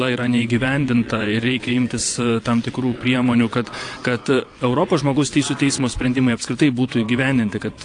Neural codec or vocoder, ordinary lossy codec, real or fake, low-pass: vocoder, 22.05 kHz, 80 mel bands, WaveNeXt; AAC, 48 kbps; fake; 9.9 kHz